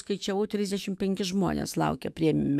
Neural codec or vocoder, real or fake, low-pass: autoencoder, 48 kHz, 128 numbers a frame, DAC-VAE, trained on Japanese speech; fake; 14.4 kHz